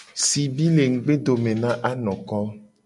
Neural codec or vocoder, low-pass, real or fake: none; 10.8 kHz; real